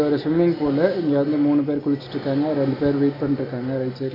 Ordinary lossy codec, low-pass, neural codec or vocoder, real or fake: none; 5.4 kHz; none; real